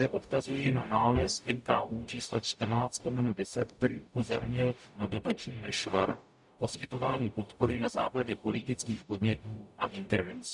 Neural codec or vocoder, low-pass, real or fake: codec, 44.1 kHz, 0.9 kbps, DAC; 10.8 kHz; fake